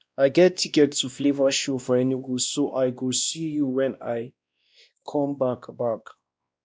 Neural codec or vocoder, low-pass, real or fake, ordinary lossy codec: codec, 16 kHz, 1 kbps, X-Codec, WavLM features, trained on Multilingual LibriSpeech; none; fake; none